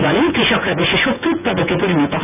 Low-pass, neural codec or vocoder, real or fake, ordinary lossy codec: 3.6 kHz; vocoder, 24 kHz, 100 mel bands, Vocos; fake; none